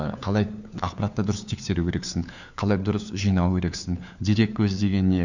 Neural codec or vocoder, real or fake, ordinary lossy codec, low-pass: codec, 16 kHz, 4 kbps, FunCodec, trained on LibriTTS, 50 frames a second; fake; none; 7.2 kHz